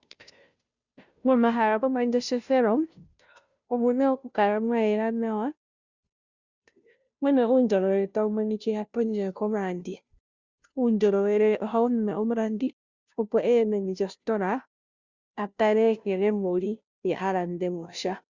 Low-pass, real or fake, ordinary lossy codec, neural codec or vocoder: 7.2 kHz; fake; Opus, 64 kbps; codec, 16 kHz, 0.5 kbps, FunCodec, trained on Chinese and English, 25 frames a second